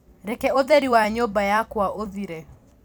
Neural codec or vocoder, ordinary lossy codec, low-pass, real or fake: vocoder, 44.1 kHz, 128 mel bands every 256 samples, BigVGAN v2; none; none; fake